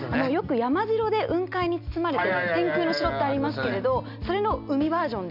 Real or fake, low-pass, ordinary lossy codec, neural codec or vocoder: real; 5.4 kHz; none; none